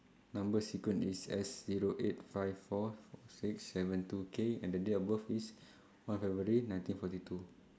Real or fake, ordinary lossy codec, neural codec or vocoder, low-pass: real; none; none; none